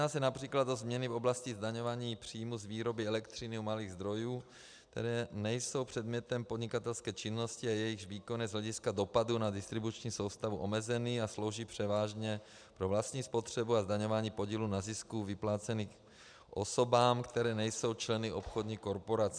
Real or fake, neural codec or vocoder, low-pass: real; none; 9.9 kHz